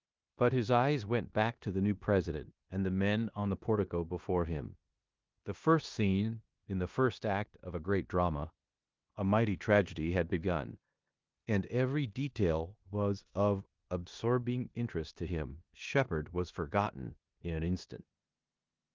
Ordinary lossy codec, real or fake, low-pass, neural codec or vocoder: Opus, 32 kbps; fake; 7.2 kHz; codec, 16 kHz in and 24 kHz out, 0.9 kbps, LongCat-Audio-Codec, four codebook decoder